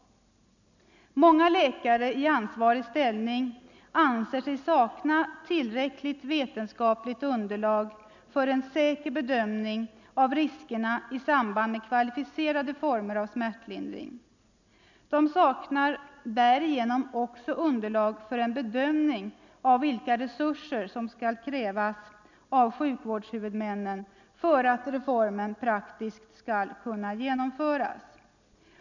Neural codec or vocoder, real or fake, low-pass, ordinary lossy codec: none; real; 7.2 kHz; none